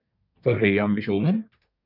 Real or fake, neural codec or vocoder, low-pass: fake; codec, 24 kHz, 1 kbps, SNAC; 5.4 kHz